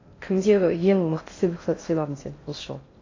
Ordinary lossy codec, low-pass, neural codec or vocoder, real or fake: AAC, 32 kbps; 7.2 kHz; codec, 16 kHz in and 24 kHz out, 0.6 kbps, FocalCodec, streaming, 4096 codes; fake